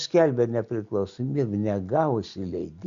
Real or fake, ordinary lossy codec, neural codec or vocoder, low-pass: real; Opus, 64 kbps; none; 7.2 kHz